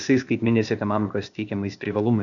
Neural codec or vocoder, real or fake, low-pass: codec, 16 kHz, 0.8 kbps, ZipCodec; fake; 7.2 kHz